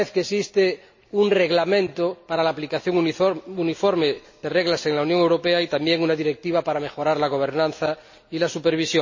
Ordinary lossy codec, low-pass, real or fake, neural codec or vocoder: MP3, 32 kbps; 7.2 kHz; real; none